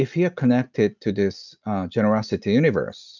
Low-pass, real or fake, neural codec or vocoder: 7.2 kHz; real; none